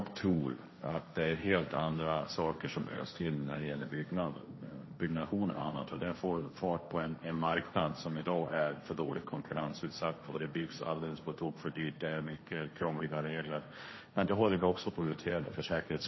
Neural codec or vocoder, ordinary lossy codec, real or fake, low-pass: codec, 16 kHz, 1.1 kbps, Voila-Tokenizer; MP3, 24 kbps; fake; 7.2 kHz